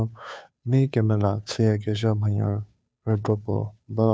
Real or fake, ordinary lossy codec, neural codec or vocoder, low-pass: fake; none; codec, 16 kHz, 2 kbps, FunCodec, trained on Chinese and English, 25 frames a second; none